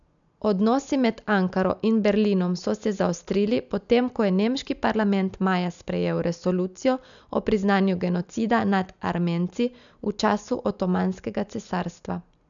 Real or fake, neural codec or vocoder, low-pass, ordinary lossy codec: real; none; 7.2 kHz; none